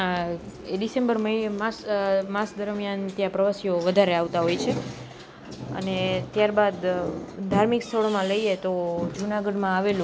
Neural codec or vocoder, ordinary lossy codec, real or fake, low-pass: none; none; real; none